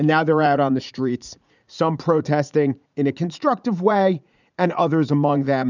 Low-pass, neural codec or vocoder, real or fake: 7.2 kHz; vocoder, 44.1 kHz, 80 mel bands, Vocos; fake